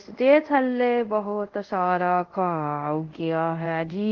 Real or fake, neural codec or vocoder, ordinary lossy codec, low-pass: fake; codec, 24 kHz, 0.5 kbps, DualCodec; Opus, 16 kbps; 7.2 kHz